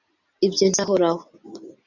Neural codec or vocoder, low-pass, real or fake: none; 7.2 kHz; real